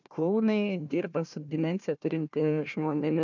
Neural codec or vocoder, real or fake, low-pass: codec, 16 kHz, 1 kbps, FunCodec, trained on Chinese and English, 50 frames a second; fake; 7.2 kHz